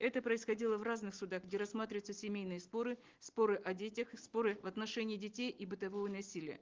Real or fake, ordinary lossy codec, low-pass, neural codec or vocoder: real; Opus, 16 kbps; 7.2 kHz; none